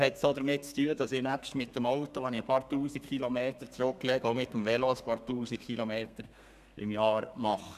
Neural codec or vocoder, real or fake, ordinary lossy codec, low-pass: codec, 32 kHz, 1.9 kbps, SNAC; fake; none; 14.4 kHz